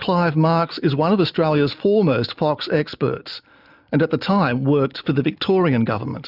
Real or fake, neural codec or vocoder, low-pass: real; none; 5.4 kHz